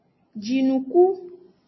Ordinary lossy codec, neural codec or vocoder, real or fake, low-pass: MP3, 24 kbps; none; real; 7.2 kHz